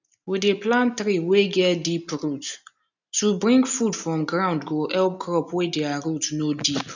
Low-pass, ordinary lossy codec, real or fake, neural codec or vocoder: 7.2 kHz; none; real; none